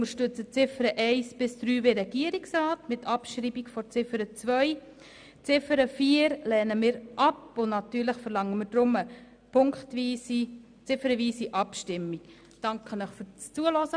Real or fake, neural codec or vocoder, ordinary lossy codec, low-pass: real; none; none; 9.9 kHz